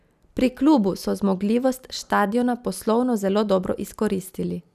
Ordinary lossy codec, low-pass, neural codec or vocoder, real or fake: none; 14.4 kHz; none; real